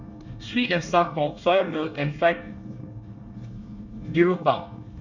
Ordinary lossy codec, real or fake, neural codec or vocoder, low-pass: none; fake; codec, 24 kHz, 1 kbps, SNAC; 7.2 kHz